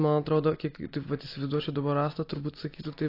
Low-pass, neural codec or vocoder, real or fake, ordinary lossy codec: 5.4 kHz; none; real; AAC, 32 kbps